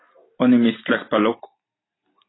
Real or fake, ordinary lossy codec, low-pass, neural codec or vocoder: real; AAC, 16 kbps; 7.2 kHz; none